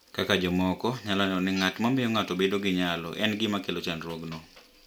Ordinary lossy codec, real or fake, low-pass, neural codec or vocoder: none; real; none; none